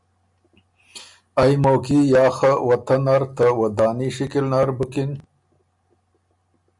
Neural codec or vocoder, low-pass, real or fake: none; 10.8 kHz; real